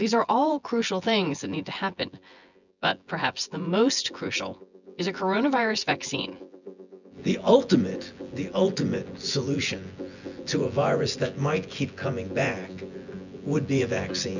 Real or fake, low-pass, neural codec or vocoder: fake; 7.2 kHz; vocoder, 24 kHz, 100 mel bands, Vocos